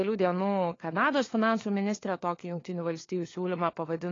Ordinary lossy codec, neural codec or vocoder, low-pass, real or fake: AAC, 32 kbps; codec, 16 kHz, 4 kbps, FunCodec, trained on LibriTTS, 50 frames a second; 7.2 kHz; fake